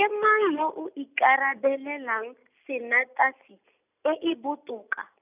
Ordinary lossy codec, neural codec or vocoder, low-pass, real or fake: none; none; 3.6 kHz; real